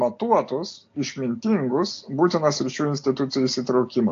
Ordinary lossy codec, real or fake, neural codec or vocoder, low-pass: AAC, 48 kbps; real; none; 7.2 kHz